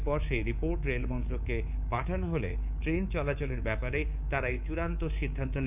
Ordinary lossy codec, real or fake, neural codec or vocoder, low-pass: none; fake; codec, 24 kHz, 3.1 kbps, DualCodec; 3.6 kHz